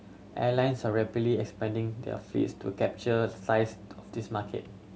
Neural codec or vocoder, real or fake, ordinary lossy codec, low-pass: none; real; none; none